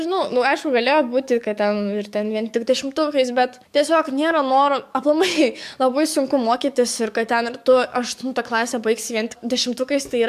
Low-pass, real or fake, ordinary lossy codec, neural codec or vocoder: 14.4 kHz; fake; MP3, 96 kbps; codec, 44.1 kHz, 7.8 kbps, DAC